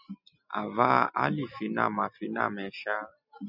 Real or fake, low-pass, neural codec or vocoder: real; 5.4 kHz; none